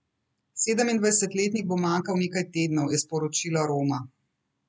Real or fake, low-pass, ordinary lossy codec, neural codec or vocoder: real; none; none; none